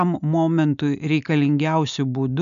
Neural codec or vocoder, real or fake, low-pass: none; real; 7.2 kHz